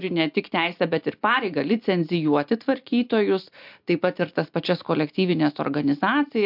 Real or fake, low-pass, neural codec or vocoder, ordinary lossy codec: real; 5.4 kHz; none; MP3, 48 kbps